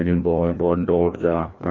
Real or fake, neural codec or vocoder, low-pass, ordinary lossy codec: fake; codec, 16 kHz, 1 kbps, FreqCodec, larger model; 7.2 kHz; AAC, 32 kbps